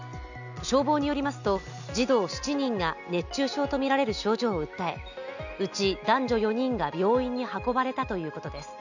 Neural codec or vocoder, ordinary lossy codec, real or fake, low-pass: none; none; real; 7.2 kHz